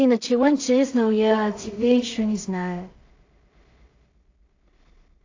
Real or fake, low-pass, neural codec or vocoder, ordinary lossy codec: fake; 7.2 kHz; codec, 16 kHz in and 24 kHz out, 0.4 kbps, LongCat-Audio-Codec, two codebook decoder; AAC, 48 kbps